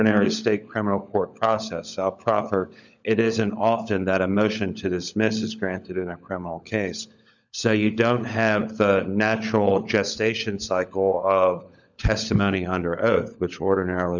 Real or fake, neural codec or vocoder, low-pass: fake; codec, 16 kHz, 16 kbps, FunCodec, trained on LibriTTS, 50 frames a second; 7.2 kHz